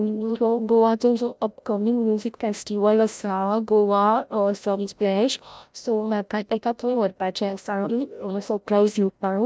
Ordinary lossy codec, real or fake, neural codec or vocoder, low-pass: none; fake; codec, 16 kHz, 0.5 kbps, FreqCodec, larger model; none